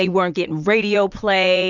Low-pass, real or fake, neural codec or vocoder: 7.2 kHz; fake; vocoder, 44.1 kHz, 80 mel bands, Vocos